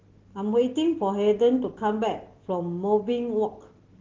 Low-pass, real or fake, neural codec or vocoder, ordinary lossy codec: 7.2 kHz; real; none; Opus, 16 kbps